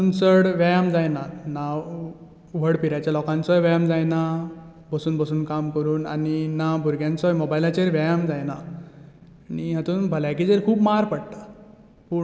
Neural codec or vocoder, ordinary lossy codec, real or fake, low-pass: none; none; real; none